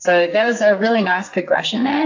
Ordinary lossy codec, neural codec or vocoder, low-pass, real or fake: AAC, 48 kbps; codec, 44.1 kHz, 2.6 kbps, SNAC; 7.2 kHz; fake